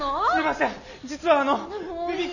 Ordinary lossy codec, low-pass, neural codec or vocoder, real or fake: none; 7.2 kHz; none; real